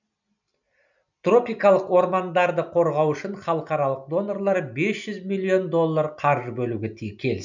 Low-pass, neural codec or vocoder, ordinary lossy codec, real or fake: 7.2 kHz; none; none; real